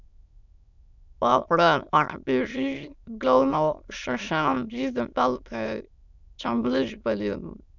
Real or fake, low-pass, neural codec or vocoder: fake; 7.2 kHz; autoencoder, 22.05 kHz, a latent of 192 numbers a frame, VITS, trained on many speakers